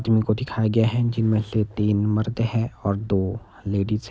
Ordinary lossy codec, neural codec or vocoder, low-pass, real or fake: none; none; none; real